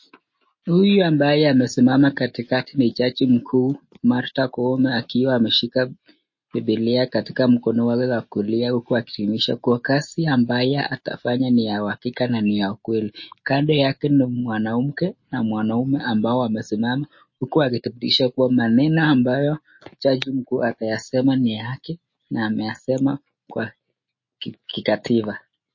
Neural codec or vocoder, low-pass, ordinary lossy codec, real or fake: none; 7.2 kHz; MP3, 32 kbps; real